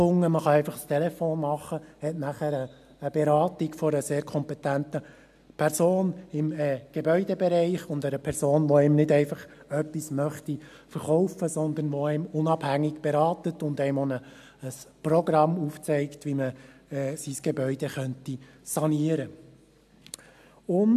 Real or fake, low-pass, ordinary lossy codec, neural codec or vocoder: real; 14.4 kHz; AAC, 96 kbps; none